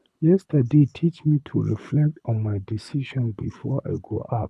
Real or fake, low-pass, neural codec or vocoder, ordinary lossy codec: fake; none; codec, 24 kHz, 6 kbps, HILCodec; none